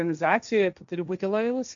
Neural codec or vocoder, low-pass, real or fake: codec, 16 kHz, 1.1 kbps, Voila-Tokenizer; 7.2 kHz; fake